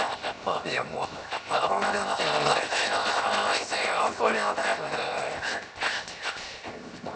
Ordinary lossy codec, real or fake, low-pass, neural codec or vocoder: none; fake; none; codec, 16 kHz, 0.7 kbps, FocalCodec